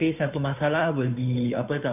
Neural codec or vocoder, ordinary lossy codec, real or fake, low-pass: codec, 16 kHz, 2 kbps, FunCodec, trained on Chinese and English, 25 frames a second; none; fake; 3.6 kHz